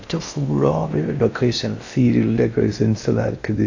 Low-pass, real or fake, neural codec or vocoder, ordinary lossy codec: 7.2 kHz; fake; codec, 16 kHz in and 24 kHz out, 0.6 kbps, FocalCodec, streaming, 4096 codes; AAC, 48 kbps